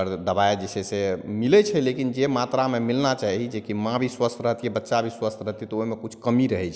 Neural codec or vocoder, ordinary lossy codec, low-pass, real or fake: none; none; none; real